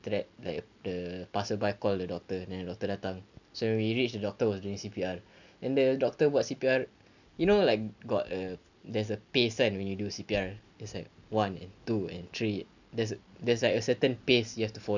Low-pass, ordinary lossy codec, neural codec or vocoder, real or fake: 7.2 kHz; none; none; real